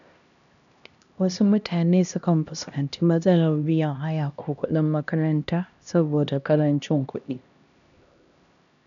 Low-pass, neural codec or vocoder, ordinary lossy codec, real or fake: 7.2 kHz; codec, 16 kHz, 1 kbps, X-Codec, HuBERT features, trained on LibriSpeech; none; fake